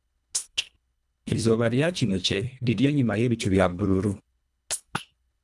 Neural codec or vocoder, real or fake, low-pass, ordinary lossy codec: codec, 24 kHz, 1.5 kbps, HILCodec; fake; none; none